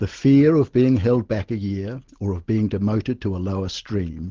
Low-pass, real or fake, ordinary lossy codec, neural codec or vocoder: 7.2 kHz; real; Opus, 16 kbps; none